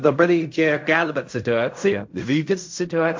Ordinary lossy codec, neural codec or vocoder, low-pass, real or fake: MP3, 48 kbps; codec, 16 kHz in and 24 kHz out, 0.4 kbps, LongCat-Audio-Codec, fine tuned four codebook decoder; 7.2 kHz; fake